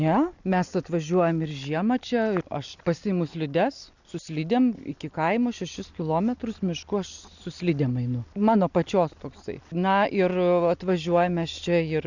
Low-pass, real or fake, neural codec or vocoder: 7.2 kHz; real; none